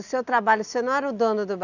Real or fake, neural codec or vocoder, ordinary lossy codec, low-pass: real; none; none; 7.2 kHz